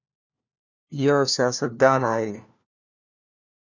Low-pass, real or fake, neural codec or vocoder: 7.2 kHz; fake; codec, 16 kHz, 1 kbps, FunCodec, trained on LibriTTS, 50 frames a second